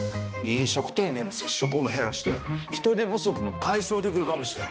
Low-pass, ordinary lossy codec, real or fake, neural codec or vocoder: none; none; fake; codec, 16 kHz, 1 kbps, X-Codec, HuBERT features, trained on balanced general audio